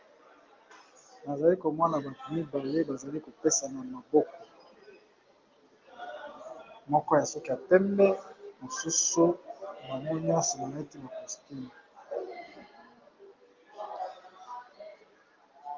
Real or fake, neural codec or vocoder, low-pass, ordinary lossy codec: real; none; 7.2 kHz; Opus, 32 kbps